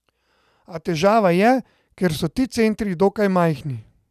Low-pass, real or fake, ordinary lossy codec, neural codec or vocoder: 14.4 kHz; real; none; none